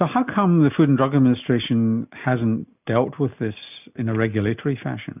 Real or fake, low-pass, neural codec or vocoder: real; 3.6 kHz; none